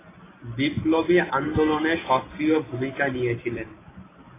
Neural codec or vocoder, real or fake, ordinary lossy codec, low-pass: none; real; AAC, 16 kbps; 3.6 kHz